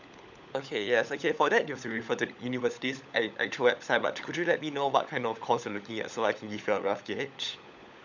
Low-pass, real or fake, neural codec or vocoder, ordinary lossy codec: 7.2 kHz; fake; codec, 16 kHz, 16 kbps, FunCodec, trained on LibriTTS, 50 frames a second; none